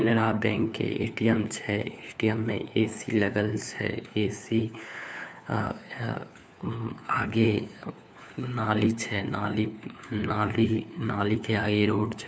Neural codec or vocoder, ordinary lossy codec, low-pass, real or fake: codec, 16 kHz, 4 kbps, FunCodec, trained on LibriTTS, 50 frames a second; none; none; fake